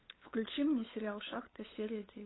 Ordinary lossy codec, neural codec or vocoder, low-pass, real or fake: AAC, 16 kbps; codec, 16 kHz, 8 kbps, FunCodec, trained on LibriTTS, 25 frames a second; 7.2 kHz; fake